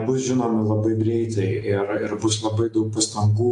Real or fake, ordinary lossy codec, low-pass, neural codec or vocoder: real; AAC, 48 kbps; 10.8 kHz; none